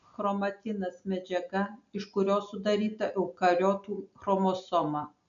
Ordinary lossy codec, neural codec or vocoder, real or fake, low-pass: MP3, 96 kbps; none; real; 7.2 kHz